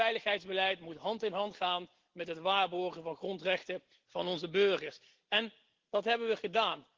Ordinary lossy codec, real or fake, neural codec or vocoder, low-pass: Opus, 16 kbps; real; none; 7.2 kHz